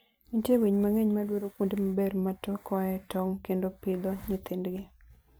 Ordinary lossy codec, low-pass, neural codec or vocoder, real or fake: none; none; vocoder, 44.1 kHz, 128 mel bands every 256 samples, BigVGAN v2; fake